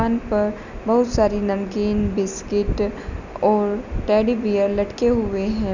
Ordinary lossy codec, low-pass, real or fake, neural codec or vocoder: none; 7.2 kHz; real; none